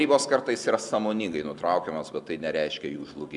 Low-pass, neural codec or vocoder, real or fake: 10.8 kHz; none; real